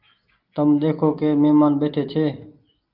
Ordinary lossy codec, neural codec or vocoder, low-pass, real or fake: Opus, 24 kbps; none; 5.4 kHz; real